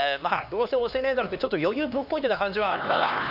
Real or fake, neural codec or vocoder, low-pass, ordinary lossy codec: fake; codec, 16 kHz, 2 kbps, X-Codec, HuBERT features, trained on LibriSpeech; 5.4 kHz; none